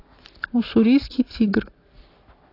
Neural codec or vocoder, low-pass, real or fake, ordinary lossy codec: none; 5.4 kHz; real; AAC, 32 kbps